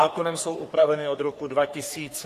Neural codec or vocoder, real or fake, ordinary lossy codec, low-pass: codec, 44.1 kHz, 3.4 kbps, Pupu-Codec; fake; AAC, 64 kbps; 14.4 kHz